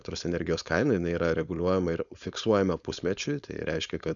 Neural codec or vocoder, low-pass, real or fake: codec, 16 kHz, 4.8 kbps, FACodec; 7.2 kHz; fake